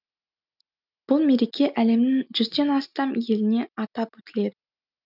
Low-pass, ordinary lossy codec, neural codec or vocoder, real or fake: 5.4 kHz; none; none; real